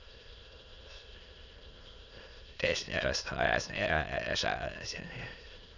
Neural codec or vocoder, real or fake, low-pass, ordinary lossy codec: autoencoder, 22.05 kHz, a latent of 192 numbers a frame, VITS, trained on many speakers; fake; 7.2 kHz; none